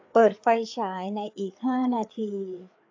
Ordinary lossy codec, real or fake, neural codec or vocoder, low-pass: AAC, 48 kbps; fake; codec, 16 kHz, 4 kbps, FreqCodec, larger model; 7.2 kHz